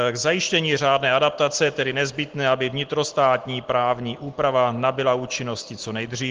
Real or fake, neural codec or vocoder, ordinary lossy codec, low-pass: real; none; Opus, 16 kbps; 7.2 kHz